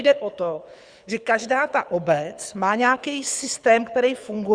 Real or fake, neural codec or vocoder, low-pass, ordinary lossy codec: fake; codec, 24 kHz, 6 kbps, HILCodec; 9.9 kHz; Opus, 64 kbps